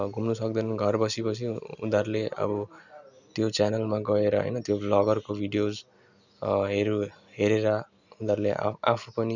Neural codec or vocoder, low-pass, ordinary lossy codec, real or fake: none; 7.2 kHz; none; real